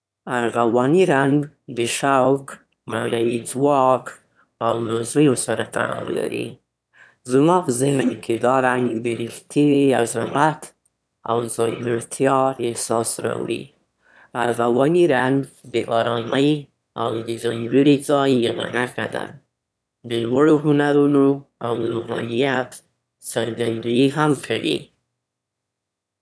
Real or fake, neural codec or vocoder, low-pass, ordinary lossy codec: fake; autoencoder, 22.05 kHz, a latent of 192 numbers a frame, VITS, trained on one speaker; none; none